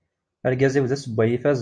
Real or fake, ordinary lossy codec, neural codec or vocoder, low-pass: real; MP3, 48 kbps; none; 9.9 kHz